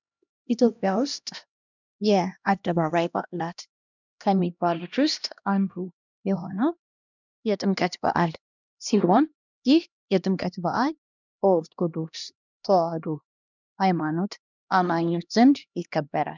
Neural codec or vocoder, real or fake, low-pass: codec, 16 kHz, 1 kbps, X-Codec, HuBERT features, trained on LibriSpeech; fake; 7.2 kHz